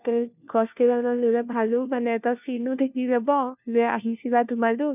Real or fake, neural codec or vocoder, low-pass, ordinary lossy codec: fake; codec, 16 kHz, 1 kbps, FunCodec, trained on LibriTTS, 50 frames a second; 3.6 kHz; none